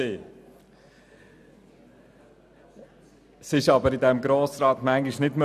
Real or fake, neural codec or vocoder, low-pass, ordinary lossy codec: real; none; 14.4 kHz; none